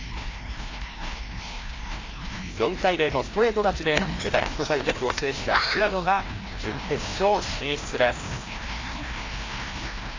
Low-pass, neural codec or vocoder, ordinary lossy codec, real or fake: 7.2 kHz; codec, 16 kHz, 1 kbps, FreqCodec, larger model; AAC, 32 kbps; fake